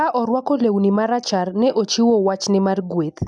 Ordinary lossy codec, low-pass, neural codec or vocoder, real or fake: none; 9.9 kHz; none; real